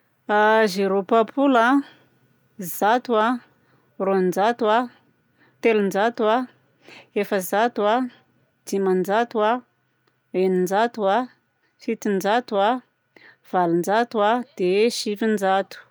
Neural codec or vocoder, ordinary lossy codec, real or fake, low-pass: none; none; real; none